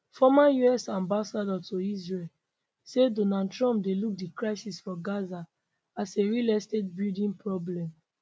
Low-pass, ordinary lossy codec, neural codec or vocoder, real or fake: none; none; none; real